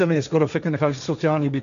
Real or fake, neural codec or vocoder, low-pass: fake; codec, 16 kHz, 1.1 kbps, Voila-Tokenizer; 7.2 kHz